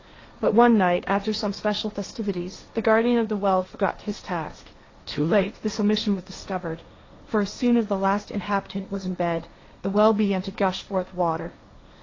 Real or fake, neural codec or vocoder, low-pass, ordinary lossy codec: fake; codec, 16 kHz, 1.1 kbps, Voila-Tokenizer; 7.2 kHz; AAC, 32 kbps